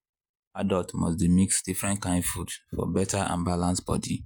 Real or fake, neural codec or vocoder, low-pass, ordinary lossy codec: fake; vocoder, 48 kHz, 128 mel bands, Vocos; none; none